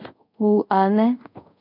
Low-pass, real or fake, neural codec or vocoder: 5.4 kHz; fake; codec, 24 kHz, 0.5 kbps, DualCodec